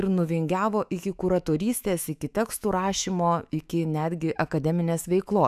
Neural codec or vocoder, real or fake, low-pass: autoencoder, 48 kHz, 128 numbers a frame, DAC-VAE, trained on Japanese speech; fake; 14.4 kHz